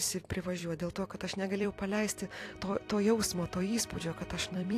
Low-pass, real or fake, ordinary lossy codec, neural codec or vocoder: 14.4 kHz; fake; AAC, 64 kbps; vocoder, 44.1 kHz, 128 mel bands every 256 samples, BigVGAN v2